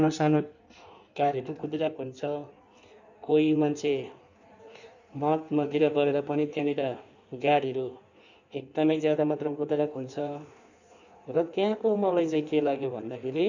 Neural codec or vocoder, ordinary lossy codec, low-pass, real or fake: codec, 16 kHz in and 24 kHz out, 1.1 kbps, FireRedTTS-2 codec; none; 7.2 kHz; fake